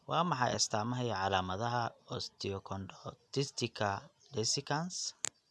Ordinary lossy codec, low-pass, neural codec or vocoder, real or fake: none; none; none; real